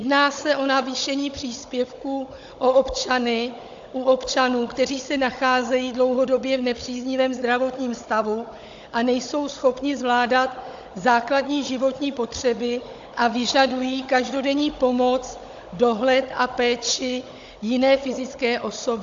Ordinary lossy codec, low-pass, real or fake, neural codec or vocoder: AAC, 64 kbps; 7.2 kHz; fake; codec, 16 kHz, 16 kbps, FunCodec, trained on Chinese and English, 50 frames a second